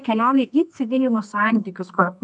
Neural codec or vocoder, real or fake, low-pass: codec, 24 kHz, 0.9 kbps, WavTokenizer, medium music audio release; fake; 10.8 kHz